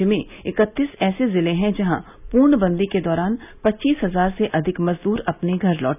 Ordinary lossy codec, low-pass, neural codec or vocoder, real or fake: none; 3.6 kHz; none; real